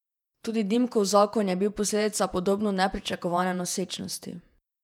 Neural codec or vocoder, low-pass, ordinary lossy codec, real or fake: vocoder, 48 kHz, 128 mel bands, Vocos; 19.8 kHz; none; fake